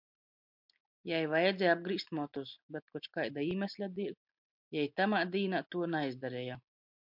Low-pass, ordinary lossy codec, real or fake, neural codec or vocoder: 5.4 kHz; MP3, 48 kbps; real; none